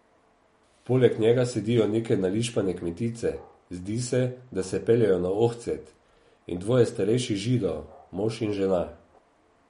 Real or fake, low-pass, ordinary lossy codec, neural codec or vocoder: real; 19.8 kHz; MP3, 48 kbps; none